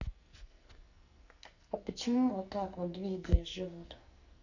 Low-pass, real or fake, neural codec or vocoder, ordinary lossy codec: 7.2 kHz; fake; codec, 44.1 kHz, 2.6 kbps, SNAC; none